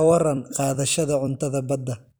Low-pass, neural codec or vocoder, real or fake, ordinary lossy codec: none; vocoder, 44.1 kHz, 128 mel bands every 256 samples, BigVGAN v2; fake; none